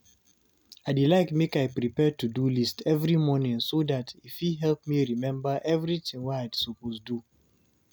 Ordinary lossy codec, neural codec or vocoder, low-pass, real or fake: none; none; none; real